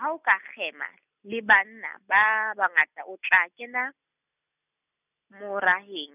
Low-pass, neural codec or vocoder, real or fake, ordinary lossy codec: 3.6 kHz; none; real; none